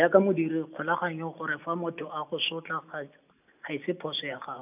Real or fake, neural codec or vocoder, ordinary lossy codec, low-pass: real; none; none; 3.6 kHz